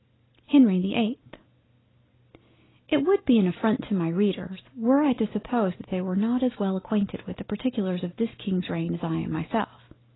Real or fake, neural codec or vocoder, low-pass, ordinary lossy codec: real; none; 7.2 kHz; AAC, 16 kbps